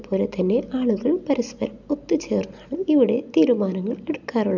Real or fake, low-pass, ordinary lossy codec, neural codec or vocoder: real; 7.2 kHz; none; none